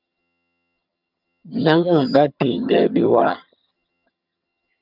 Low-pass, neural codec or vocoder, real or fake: 5.4 kHz; vocoder, 22.05 kHz, 80 mel bands, HiFi-GAN; fake